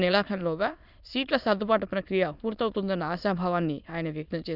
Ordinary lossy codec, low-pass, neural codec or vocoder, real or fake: none; 5.4 kHz; autoencoder, 22.05 kHz, a latent of 192 numbers a frame, VITS, trained on many speakers; fake